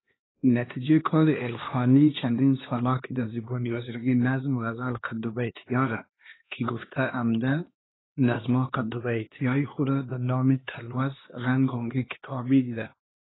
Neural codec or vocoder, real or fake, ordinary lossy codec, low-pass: codec, 16 kHz, 2 kbps, X-Codec, HuBERT features, trained on LibriSpeech; fake; AAC, 16 kbps; 7.2 kHz